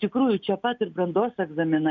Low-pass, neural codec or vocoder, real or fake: 7.2 kHz; none; real